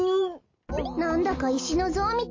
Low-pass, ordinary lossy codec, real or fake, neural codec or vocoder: 7.2 kHz; MP3, 32 kbps; real; none